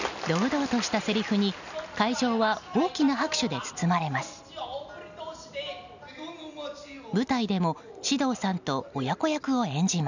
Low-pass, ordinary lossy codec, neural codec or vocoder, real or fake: 7.2 kHz; none; none; real